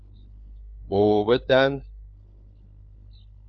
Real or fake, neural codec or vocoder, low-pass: fake; codec, 16 kHz, 4 kbps, FunCodec, trained on LibriTTS, 50 frames a second; 7.2 kHz